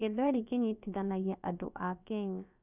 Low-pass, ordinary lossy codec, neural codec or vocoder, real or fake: 3.6 kHz; none; codec, 16 kHz, about 1 kbps, DyCAST, with the encoder's durations; fake